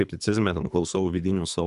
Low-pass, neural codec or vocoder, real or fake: 10.8 kHz; codec, 24 kHz, 3 kbps, HILCodec; fake